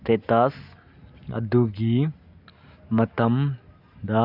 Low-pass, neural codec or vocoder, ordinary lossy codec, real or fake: 5.4 kHz; none; none; real